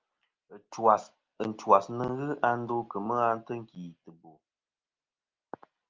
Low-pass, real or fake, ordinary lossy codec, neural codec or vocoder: 7.2 kHz; real; Opus, 24 kbps; none